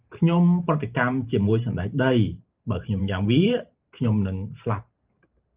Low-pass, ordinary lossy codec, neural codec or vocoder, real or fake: 3.6 kHz; Opus, 32 kbps; vocoder, 44.1 kHz, 128 mel bands every 512 samples, BigVGAN v2; fake